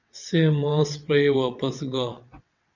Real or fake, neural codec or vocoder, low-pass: fake; vocoder, 22.05 kHz, 80 mel bands, WaveNeXt; 7.2 kHz